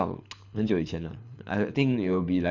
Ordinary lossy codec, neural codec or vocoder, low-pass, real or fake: none; codec, 24 kHz, 6 kbps, HILCodec; 7.2 kHz; fake